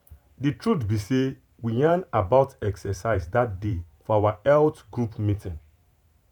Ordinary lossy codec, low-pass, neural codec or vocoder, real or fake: none; 19.8 kHz; vocoder, 44.1 kHz, 128 mel bands every 512 samples, BigVGAN v2; fake